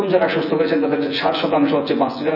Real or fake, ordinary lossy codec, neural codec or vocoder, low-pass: fake; none; vocoder, 24 kHz, 100 mel bands, Vocos; 5.4 kHz